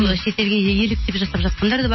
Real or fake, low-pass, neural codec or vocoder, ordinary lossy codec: real; 7.2 kHz; none; MP3, 24 kbps